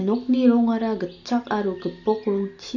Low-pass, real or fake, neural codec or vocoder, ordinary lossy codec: 7.2 kHz; real; none; none